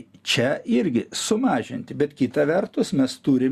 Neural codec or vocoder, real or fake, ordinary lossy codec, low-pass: none; real; Opus, 64 kbps; 14.4 kHz